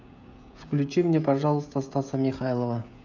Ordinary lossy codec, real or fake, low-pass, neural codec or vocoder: none; fake; 7.2 kHz; codec, 16 kHz, 16 kbps, FreqCodec, smaller model